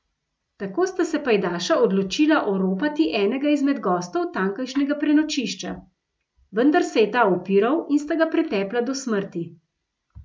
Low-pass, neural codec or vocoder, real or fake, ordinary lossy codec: none; none; real; none